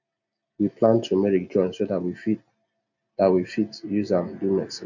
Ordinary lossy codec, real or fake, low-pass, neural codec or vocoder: none; real; 7.2 kHz; none